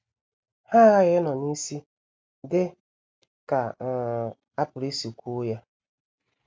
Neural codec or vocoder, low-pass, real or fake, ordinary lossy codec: none; none; real; none